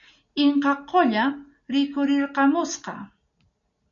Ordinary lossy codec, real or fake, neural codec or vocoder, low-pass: MP3, 96 kbps; real; none; 7.2 kHz